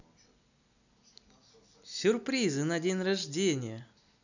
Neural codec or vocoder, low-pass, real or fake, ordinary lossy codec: none; 7.2 kHz; real; none